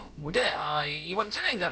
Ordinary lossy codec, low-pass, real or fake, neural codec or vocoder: none; none; fake; codec, 16 kHz, about 1 kbps, DyCAST, with the encoder's durations